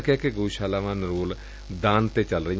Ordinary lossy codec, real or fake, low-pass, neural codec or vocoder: none; real; none; none